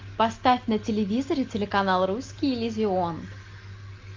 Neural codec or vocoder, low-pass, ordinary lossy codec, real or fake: none; 7.2 kHz; Opus, 24 kbps; real